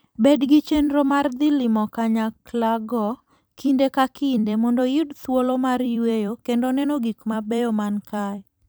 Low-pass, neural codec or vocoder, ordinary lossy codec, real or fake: none; vocoder, 44.1 kHz, 128 mel bands every 256 samples, BigVGAN v2; none; fake